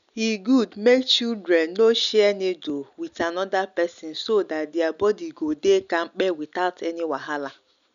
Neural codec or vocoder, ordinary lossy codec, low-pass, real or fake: none; none; 7.2 kHz; real